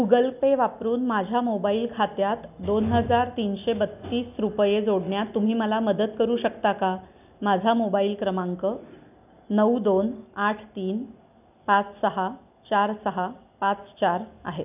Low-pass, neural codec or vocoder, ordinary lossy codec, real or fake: 3.6 kHz; none; none; real